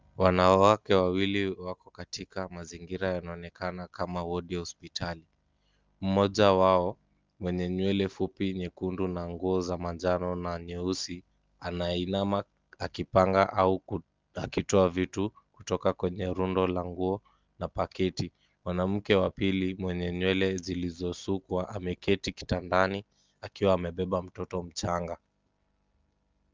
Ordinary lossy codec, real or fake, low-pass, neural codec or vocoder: Opus, 32 kbps; real; 7.2 kHz; none